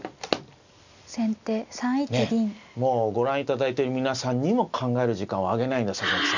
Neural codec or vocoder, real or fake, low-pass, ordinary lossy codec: none; real; 7.2 kHz; none